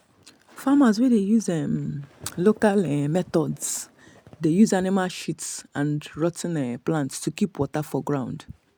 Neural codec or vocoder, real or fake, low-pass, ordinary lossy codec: none; real; none; none